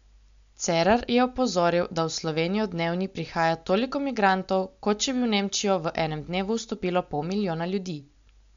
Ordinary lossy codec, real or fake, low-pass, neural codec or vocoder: MP3, 64 kbps; real; 7.2 kHz; none